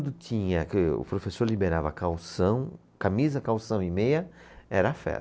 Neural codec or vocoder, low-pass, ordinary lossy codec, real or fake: none; none; none; real